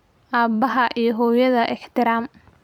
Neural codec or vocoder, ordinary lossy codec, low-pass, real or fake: vocoder, 44.1 kHz, 128 mel bands every 256 samples, BigVGAN v2; none; 19.8 kHz; fake